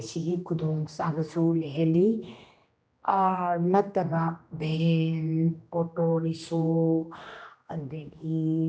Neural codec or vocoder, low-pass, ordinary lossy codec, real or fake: codec, 16 kHz, 1 kbps, X-Codec, HuBERT features, trained on general audio; none; none; fake